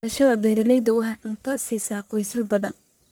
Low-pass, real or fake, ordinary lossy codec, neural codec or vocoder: none; fake; none; codec, 44.1 kHz, 1.7 kbps, Pupu-Codec